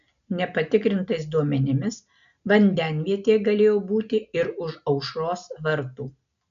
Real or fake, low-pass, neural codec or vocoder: real; 7.2 kHz; none